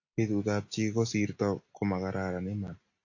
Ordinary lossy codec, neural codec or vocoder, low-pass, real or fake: AAC, 48 kbps; none; 7.2 kHz; real